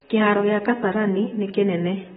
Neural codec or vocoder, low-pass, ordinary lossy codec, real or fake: vocoder, 44.1 kHz, 128 mel bands, Pupu-Vocoder; 19.8 kHz; AAC, 16 kbps; fake